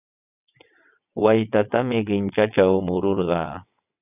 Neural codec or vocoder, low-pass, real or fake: vocoder, 22.05 kHz, 80 mel bands, WaveNeXt; 3.6 kHz; fake